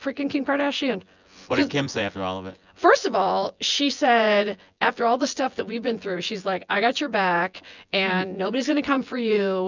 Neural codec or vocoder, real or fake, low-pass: vocoder, 24 kHz, 100 mel bands, Vocos; fake; 7.2 kHz